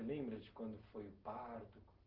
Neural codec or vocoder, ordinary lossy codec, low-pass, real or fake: none; Opus, 16 kbps; 5.4 kHz; real